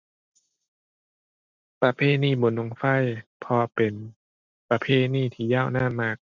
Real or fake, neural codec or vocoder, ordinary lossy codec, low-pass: real; none; none; 7.2 kHz